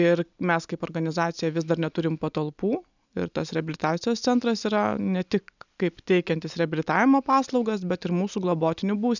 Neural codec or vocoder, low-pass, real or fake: none; 7.2 kHz; real